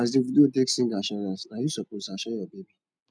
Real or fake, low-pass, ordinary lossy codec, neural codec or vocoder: real; none; none; none